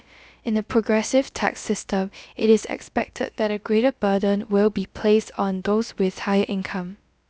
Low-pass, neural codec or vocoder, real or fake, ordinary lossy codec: none; codec, 16 kHz, about 1 kbps, DyCAST, with the encoder's durations; fake; none